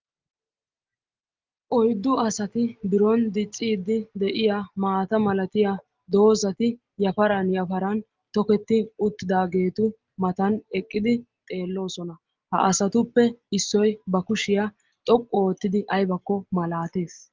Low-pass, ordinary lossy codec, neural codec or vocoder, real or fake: 7.2 kHz; Opus, 32 kbps; none; real